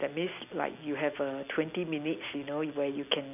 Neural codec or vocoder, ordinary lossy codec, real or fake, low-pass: none; none; real; 3.6 kHz